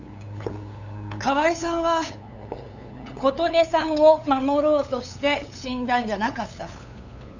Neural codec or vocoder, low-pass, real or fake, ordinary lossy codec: codec, 16 kHz, 8 kbps, FunCodec, trained on LibriTTS, 25 frames a second; 7.2 kHz; fake; none